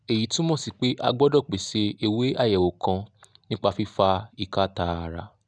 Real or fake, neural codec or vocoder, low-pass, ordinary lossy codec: real; none; 9.9 kHz; none